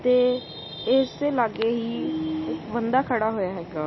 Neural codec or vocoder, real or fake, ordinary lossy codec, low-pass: none; real; MP3, 24 kbps; 7.2 kHz